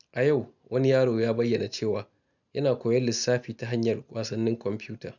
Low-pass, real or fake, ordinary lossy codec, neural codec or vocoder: 7.2 kHz; real; none; none